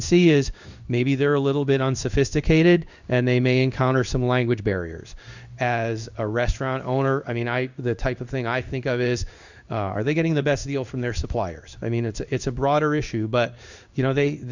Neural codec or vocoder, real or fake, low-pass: codec, 16 kHz in and 24 kHz out, 1 kbps, XY-Tokenizer; fake; 7.2 kHz